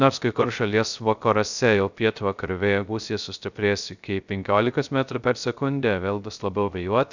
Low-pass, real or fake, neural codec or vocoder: 7.2 kHz; fake; codec, 16 kHz, 0.3 kbps, FocalCodec